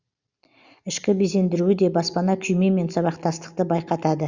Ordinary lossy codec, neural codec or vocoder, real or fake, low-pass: Opus, 64 kbps; none; real; 7.2 kHz